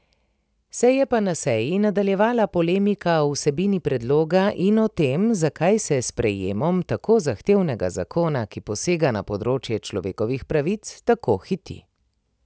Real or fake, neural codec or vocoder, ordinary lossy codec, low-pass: real; none; none; none